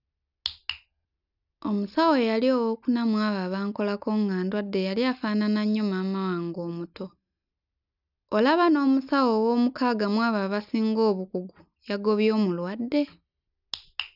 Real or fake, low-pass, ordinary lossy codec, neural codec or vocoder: real; 5.4 kHz; none; none